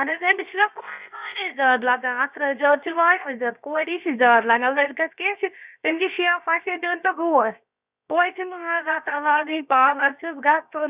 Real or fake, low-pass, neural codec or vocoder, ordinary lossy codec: fake; 3.6 kHz; codec, 16 kHz, 0.7 kbps, FocalCodec; Opus, 64 kbps